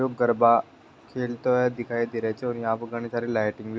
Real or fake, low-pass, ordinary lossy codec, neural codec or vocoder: real; none; none; none